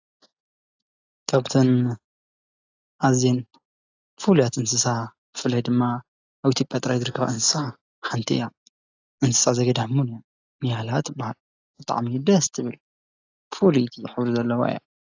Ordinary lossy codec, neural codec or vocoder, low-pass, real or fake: AAC, 48 kbps; none; 7.2 kHz; real